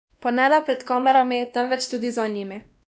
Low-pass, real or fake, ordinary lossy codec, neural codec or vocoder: none; fake; none; codec, 16 kHz, 1 kbps, X-Codec, WavLM features, trained on Multilingual LibriSpeech